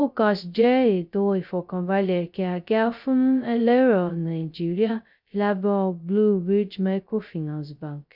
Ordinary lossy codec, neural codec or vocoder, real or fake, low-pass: none; codec, 16 kHz, 0.2 kbps, FocalCodec; fake; 5.4 kHz